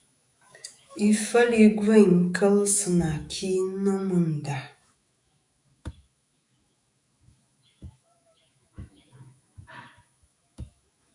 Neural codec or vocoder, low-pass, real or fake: autoencoder, 48 kHz, 128 numbers a frame, DAC-VAE, trained on Japanese speech; 10.8 kHz; fake